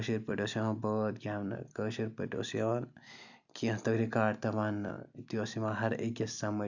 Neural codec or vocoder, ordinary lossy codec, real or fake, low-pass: none; none; real; 7.2 kHz